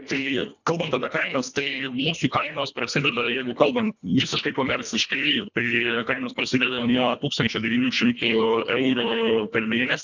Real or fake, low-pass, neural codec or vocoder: fake; 7.2 kHz; codec, 24 kHz, 1.5 kbps, HILCodec